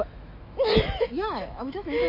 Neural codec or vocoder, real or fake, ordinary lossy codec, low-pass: autoencoder, 48 kHz, 128 numbers a frame, DAC-VAE, trained on Japanese speech; fake; MP3, 32 kbps; 5.4 kHz